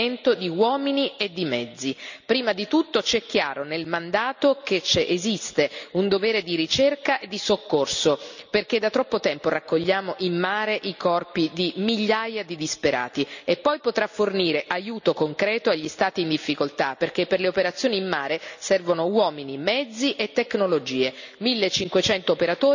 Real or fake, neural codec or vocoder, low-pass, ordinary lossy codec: real; none; 7.2 kHz; none